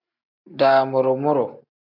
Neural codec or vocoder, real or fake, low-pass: none; real; 5.4 kHz